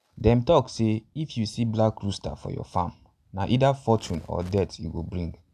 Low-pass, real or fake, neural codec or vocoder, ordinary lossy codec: 14.4 kHz; real; none; none